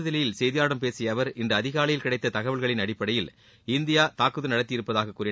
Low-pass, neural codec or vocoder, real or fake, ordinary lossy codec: none; none; real; none